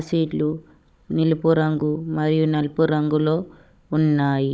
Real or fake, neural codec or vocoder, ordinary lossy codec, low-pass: fake; codec, 16 kHz, 16 kbps, FunCodec, trained on Chinese and English, 50 frames a second; none; none